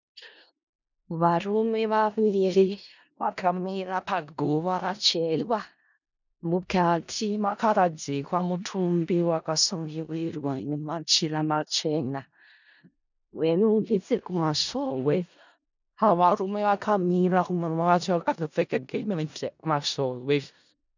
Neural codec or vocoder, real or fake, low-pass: codec, 16 kHz in and 24 kHz out, 0.4 kbps, LongCat-Audio-Codec, four codebook decoder; fake; 7.2 kHz